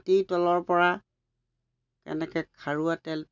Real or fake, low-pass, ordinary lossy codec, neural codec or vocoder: real; 7.2 kHz; none; none